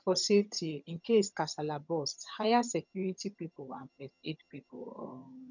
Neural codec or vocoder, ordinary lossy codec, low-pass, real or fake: vocoder, 22.05 kHz, 80 mel bands, HiFi-GAN; none; 7.2 kHz; fake